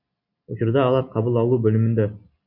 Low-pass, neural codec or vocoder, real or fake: 5.4 kHz; none; real